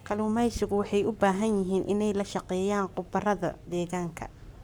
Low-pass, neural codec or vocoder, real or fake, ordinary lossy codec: none; codec, 44.1 kHz, 7.8 kbps, Pupu-Codec; fake; none